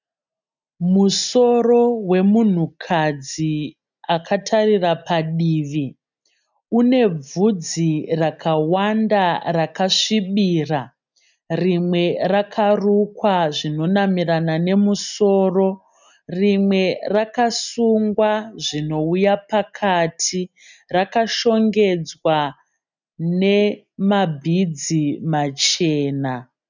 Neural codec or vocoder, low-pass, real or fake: none; 7.2 kHz; real